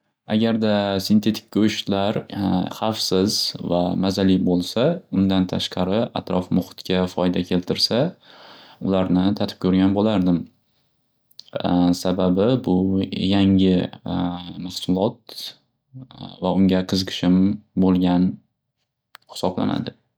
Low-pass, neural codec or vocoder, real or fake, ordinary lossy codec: none; none; real; none